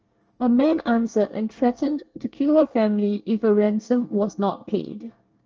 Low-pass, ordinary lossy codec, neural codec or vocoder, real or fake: 7.2 kHz; Opus, 24 kbps; codec, 24 kHz, 1 kbps, SNAC; fake